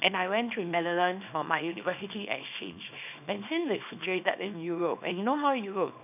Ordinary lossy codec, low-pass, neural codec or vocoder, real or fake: none; 3.6 kHz; codec, 24 kHz, 0.9 kbps, WavTokenizer, small release; fake